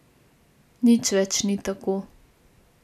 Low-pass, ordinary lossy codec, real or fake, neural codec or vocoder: 14.4 kHz; none; real; none